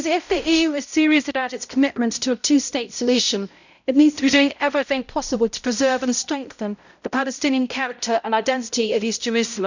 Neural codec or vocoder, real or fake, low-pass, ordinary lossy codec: codec, 16 kHz, 0.5 kbps, X-Codec, HuBERT features, trained on balanced general audio; fake; 7.2 kHz; none